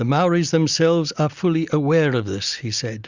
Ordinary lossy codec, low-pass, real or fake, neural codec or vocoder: Opus, 64 kbps; 7.2 kHz; real; none